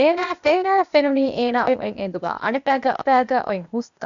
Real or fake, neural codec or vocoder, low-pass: fake; codec, 16 kHz, 0.8 kbps, ZipCodec; 7.2 kHz